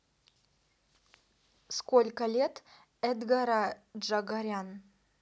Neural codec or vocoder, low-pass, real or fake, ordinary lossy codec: none; none; real; none